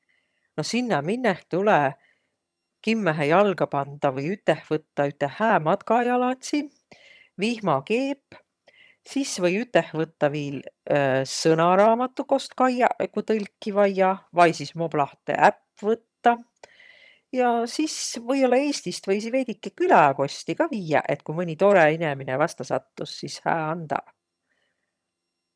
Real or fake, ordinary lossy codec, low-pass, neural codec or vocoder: fake; none; none; vocoder, 22.05 kHz, 80 mel bands, HiFi-GAN